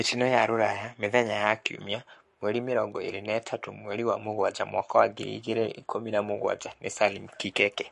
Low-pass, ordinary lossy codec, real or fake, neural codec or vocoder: 14.4 kHz; MP3, 48 kbps; fake; codec, 44.1 kHz, 7.8 kbps, Pupu-Codec